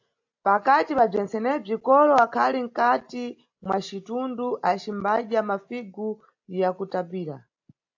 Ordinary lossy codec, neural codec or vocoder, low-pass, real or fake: AAC, 48 kbps; none; 7.2 kHz; real